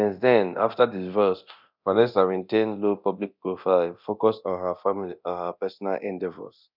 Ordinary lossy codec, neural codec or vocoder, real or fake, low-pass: none; codec, 24 kHz, 0.9 kbps, DualCodec; fake; 5.4 kHz